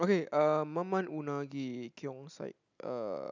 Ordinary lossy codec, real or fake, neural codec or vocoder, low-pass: none; real; none; 7.2 kHz